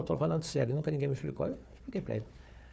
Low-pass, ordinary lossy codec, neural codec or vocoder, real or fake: none; none; codec, 16 kHz, 4 kbps, FunCodec, trained on Chinese and English, 50 frames a second; fake